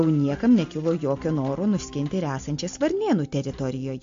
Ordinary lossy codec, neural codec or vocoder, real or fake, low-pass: MP3, 48 kbps; none; real; 7.2 kHz